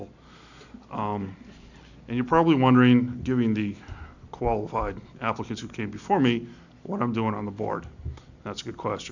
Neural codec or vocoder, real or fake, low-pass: none; real; 7.2 kHz